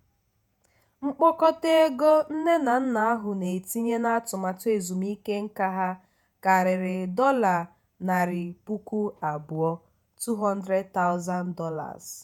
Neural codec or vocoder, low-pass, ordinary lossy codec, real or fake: vocoder, 48 kHz, 128 mel bands, Vocos; 19.8 kHz; none; fake